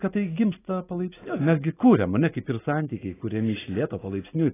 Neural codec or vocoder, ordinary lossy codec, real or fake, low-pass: none; AAC, 16 kbps; real; 3.6 kHz